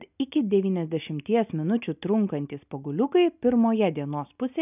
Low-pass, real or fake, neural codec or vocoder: 3.6 kHz; real; none